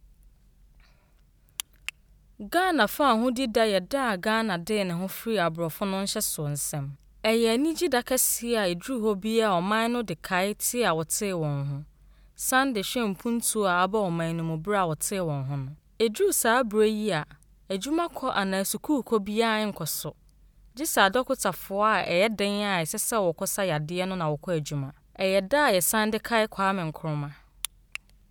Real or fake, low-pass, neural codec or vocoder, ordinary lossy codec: real; none; none; none